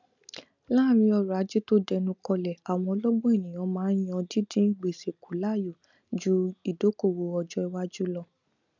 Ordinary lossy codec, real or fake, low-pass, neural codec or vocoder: none; real; 7.2 kHz; none